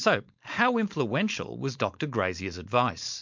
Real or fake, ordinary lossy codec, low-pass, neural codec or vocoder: fake; MP3, 64 kbps; 7.2 kHz; codec, 16 kHz, 4.8 kbps, FACodec